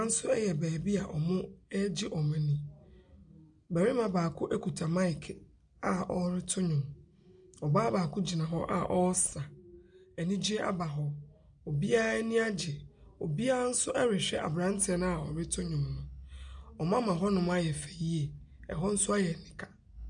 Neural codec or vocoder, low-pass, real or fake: none; 9.9 kHz; real